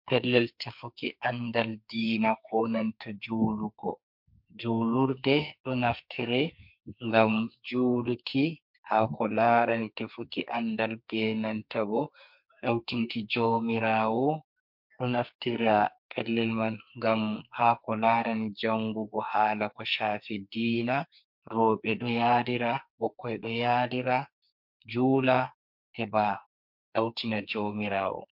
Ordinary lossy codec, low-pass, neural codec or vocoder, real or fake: MP3, 48 kbps; 5.4 kHz; codec, 44.1 kHz, 2.6 kbps, SNAC; fake